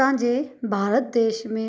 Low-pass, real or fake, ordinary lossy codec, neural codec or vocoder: none; real; none; none